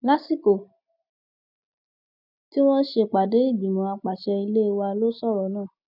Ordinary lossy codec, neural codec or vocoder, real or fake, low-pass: none; none; real; 5.4 kHz